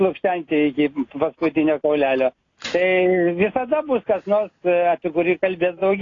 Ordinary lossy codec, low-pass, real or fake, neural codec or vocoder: AAC, 32 kbps; 7.2 kHz; real; none